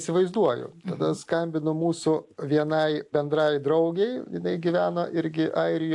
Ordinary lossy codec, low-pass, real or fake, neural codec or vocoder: AAC, 64 kbps; 10.8 kHz; real; none